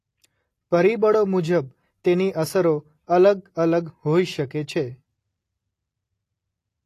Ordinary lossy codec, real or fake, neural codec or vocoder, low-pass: AAC, 48 kbps; real; none; 14.4 kHz